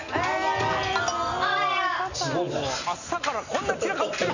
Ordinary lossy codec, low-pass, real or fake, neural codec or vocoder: none; 7.2 kHz; real; none